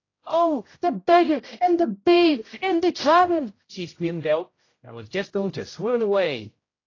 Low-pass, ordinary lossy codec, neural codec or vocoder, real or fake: 7.2 kHz; AAC, 32 kbps; codec, 16 kHz, 0.5 kbps, X-Codec, HuBERT features, trained on general audio; fake